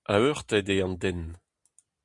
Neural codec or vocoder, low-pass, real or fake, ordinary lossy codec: none; 10.8 kHz; real; Opus, 64 kbps